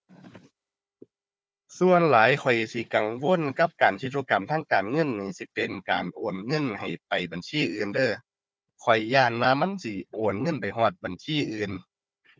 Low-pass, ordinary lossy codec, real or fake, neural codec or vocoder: none; none; fake; codec, 16 kHz, 4 kbps, FunCodec, trained on Chinese and English, 50 frames a second